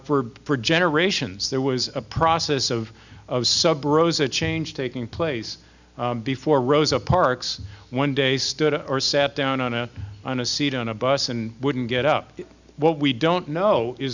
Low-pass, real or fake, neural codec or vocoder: 7.2 kHz; real; none